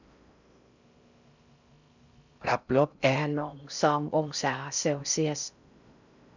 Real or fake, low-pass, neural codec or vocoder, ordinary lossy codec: fake; 7.2 kHz; codec, 16 kHz in and 24 kHz out, 0.6 kbps, FocalCodec, streaming, 4096 codes; none